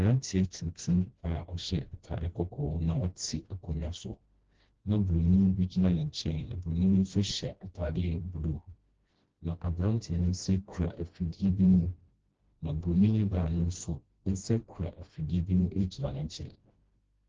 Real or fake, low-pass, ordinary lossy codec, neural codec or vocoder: fake; 7.2 kHz; Opus, 16 kbps; codec, 16 kHz, 1 kbps, FreqCodec, smaller model